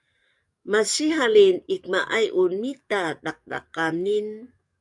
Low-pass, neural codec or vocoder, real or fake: 10.8 kHz; codec, 44.1 kHz, 7.8 kbps, DAC; fake